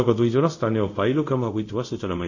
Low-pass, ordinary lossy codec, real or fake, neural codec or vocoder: 7.2 kHz; none; fake; codec, 24 kHz, 0.5 kbps, DualCodec